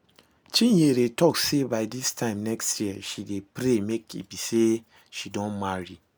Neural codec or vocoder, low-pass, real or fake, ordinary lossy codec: none; none; real; none